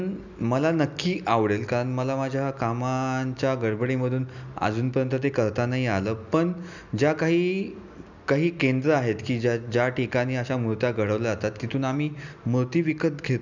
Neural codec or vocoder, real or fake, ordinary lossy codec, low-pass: none; real; none; 7.2 kHz